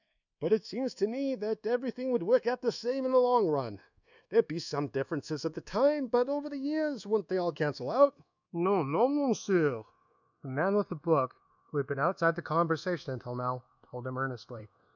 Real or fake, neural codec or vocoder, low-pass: fake; codec, 24 kHz, 1.2 kbps, DualCodec; 7.2 kHz